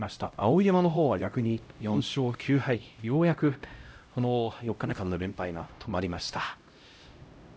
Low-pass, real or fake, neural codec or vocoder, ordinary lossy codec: none; fake; codec, 16 kHz, 0.5 kbps, X-Codec, HuBERT features, trained on LibriSpeech; none